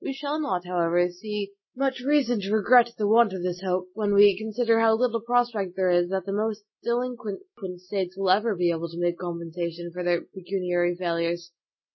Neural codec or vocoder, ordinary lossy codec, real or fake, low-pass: none; MP3, 24 kbps; real; 7.2 kHz